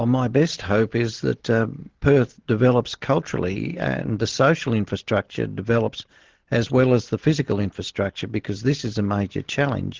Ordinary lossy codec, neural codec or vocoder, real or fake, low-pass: Opus, 16 kbps; none; real; 7.2 kHz